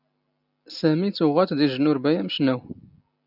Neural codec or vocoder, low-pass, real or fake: none; 5.4 kHz; real